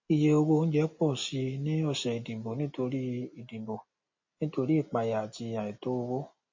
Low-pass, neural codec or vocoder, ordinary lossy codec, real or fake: 7.2 kHz; none; MP3, 32 kbps; real